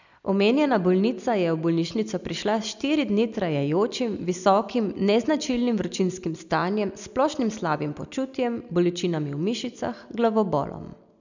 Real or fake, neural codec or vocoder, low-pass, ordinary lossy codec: real; none; 7.2 kHz; none